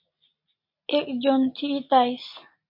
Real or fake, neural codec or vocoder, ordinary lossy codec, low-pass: real; none; MP3, 32 kbps; 5.4 kHz